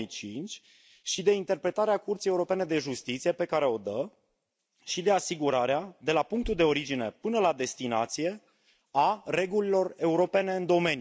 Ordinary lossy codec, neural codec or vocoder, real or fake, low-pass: none; none; real; none